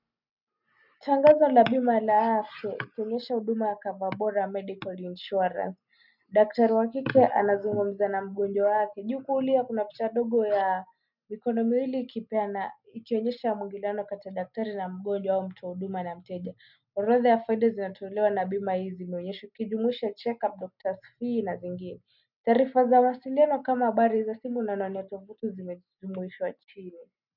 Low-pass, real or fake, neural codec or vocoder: 5.4 kHz; real; none